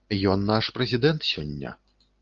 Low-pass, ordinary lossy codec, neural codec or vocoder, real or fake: 7.2 kHz; Opus, 24 kbps; none; real